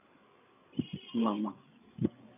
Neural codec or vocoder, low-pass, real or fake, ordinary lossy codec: codec, 16 kHz, 8 kbps, FreqCodec, larger model; 3.6 kHz; fake; none